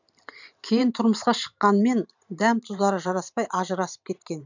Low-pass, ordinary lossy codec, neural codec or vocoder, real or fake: 7.2 kHz; none; vocoder, 44.1 kHz, 128 mel bands every 512 samples, BigVGAN v2; fake